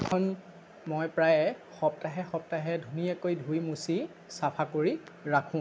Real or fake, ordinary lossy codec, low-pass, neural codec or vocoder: real; none; none; none